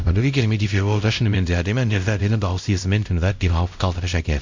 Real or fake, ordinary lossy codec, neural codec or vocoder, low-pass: fake; MP3, 64 kbps; codec, 16 kHz, 0.5 kbps, X-Codec, WavLM features, trained on Multilingual LibriSpeech; 7.2 kHz